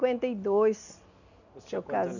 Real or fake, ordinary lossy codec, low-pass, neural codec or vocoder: real; none; 7.2 kHz; none